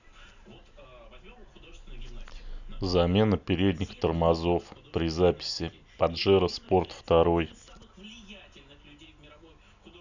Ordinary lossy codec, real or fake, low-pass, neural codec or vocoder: none; real; 7.2 kHz; none